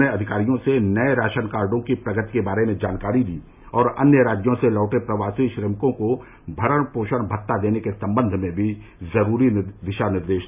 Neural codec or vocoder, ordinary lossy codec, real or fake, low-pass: none; none; real; 3.6 kHz